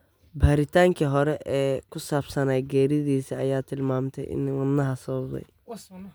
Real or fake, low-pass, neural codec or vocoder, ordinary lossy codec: real; none; none; none